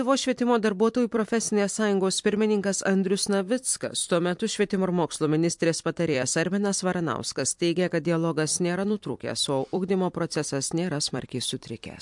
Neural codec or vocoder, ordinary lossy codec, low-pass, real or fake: vocoder, 44.1 kHz, 128 mel bands every 256 samples, BigVGAN v2; MP3, 64 kbps; 10.8 kHz; fake